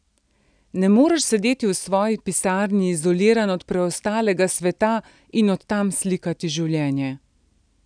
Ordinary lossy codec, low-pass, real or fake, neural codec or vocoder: none; 9.9 kHz; real; none